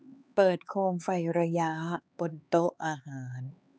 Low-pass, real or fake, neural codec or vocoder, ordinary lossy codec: none; fake; codec, 16 kHz, 4 kbps, X-Codec, HuBERT features, trained on LibriSpeech; none